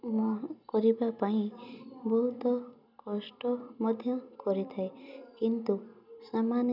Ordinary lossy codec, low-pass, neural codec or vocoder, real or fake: none; 5.4 kHz; none; real